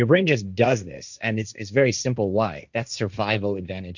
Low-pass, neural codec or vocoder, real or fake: 7.2 kHz; codec, 16 kHz, 1.1 kbps, Voila-Tokenizer; fake